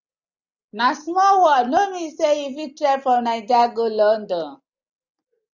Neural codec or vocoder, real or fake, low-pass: none; real; 7.2 kHz